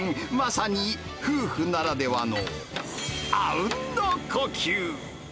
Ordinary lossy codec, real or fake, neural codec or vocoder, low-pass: none; real; none; none